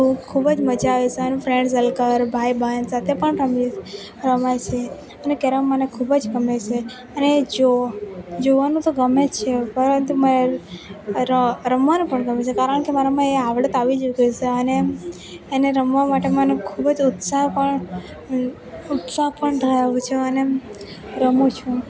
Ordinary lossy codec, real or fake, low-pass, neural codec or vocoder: none; real; none; none